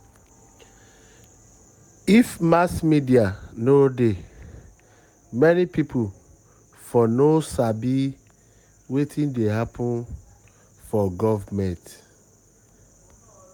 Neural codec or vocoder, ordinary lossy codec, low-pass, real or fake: none; none; 19.8 kHz; real